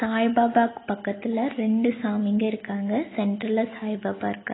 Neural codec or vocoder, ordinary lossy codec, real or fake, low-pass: none; AAC, 16 kbps; real; 7.2 kHz